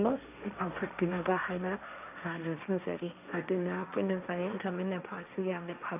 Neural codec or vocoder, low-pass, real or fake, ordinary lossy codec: codec, 16 kHz, 1.1 kbps, Voila-Tokenizer; 3.6 kHz; fake; none